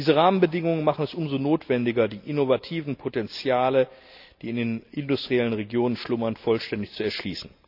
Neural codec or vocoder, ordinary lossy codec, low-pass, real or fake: none; none; 5.4 kHz; real